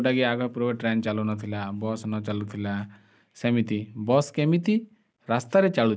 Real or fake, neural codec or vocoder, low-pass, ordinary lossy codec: real; none; none; none